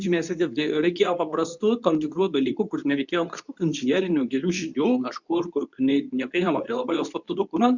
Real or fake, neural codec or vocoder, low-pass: fake; codec, 24 kHz, 0.9 kbps, WavTokenizer, medium speech release version 2; 7.2 kHz